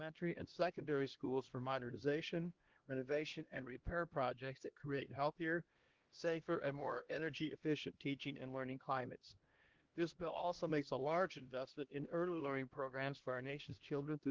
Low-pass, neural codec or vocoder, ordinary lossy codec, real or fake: 7.2 kHz; codec, 16 kHz, 1 kbps, X-Codec, HuBERT features, trained on LibriSpeech; Opus, 16 kbps; fake